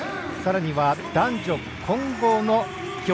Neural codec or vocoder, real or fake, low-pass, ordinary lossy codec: none; real; none; none